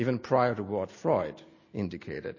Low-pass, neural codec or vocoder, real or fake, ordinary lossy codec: 7.2 kHz; none; real; MP3, 32 kbps